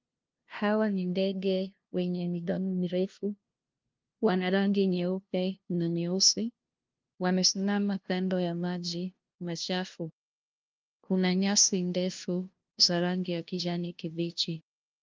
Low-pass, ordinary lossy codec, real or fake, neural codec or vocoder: 7.2 kHz; Opus, 32 kbps; fake; codec, 16 kHz, 0.5 kbps, FunCodec, trained on LibriTTS, 25 frames a second